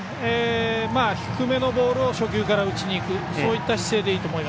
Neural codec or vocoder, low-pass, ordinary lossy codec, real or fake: none; none; none; real